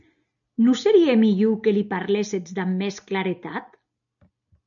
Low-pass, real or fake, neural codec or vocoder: 7.2 kHz; real; none